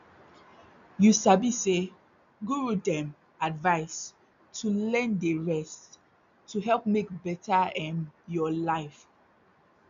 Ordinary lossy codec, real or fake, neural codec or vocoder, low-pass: MP3, 64 kbps; real; none; 7.2 kHz